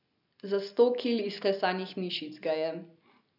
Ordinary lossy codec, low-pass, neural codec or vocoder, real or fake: none; 5.4 kHz; none; real